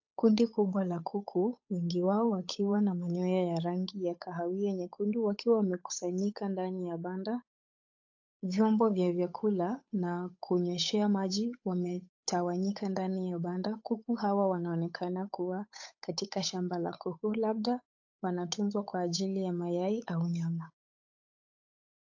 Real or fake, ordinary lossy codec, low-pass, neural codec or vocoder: fake; AAC, 48 kbps; 7.2 kHz; codec, 16 kHz, 8 kbps, FunCodec, trained on Chinese and English, 25 frames a second